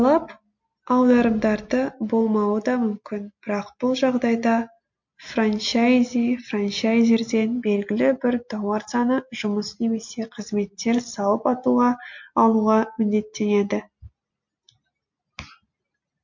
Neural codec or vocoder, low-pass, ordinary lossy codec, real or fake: none; 7.2 kHz; MP3, 48 kbps; real